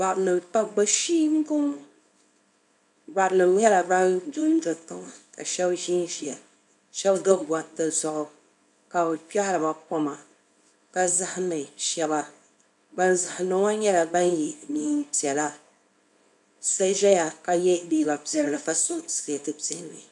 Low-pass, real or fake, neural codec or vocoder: 10.8 kHz; fake; codec, 24 kHz, 0.9 kbps, WavTokenizer, small release